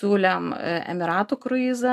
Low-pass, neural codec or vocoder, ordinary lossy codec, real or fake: 14.4 kHz; none; AAC, 96 kbps; real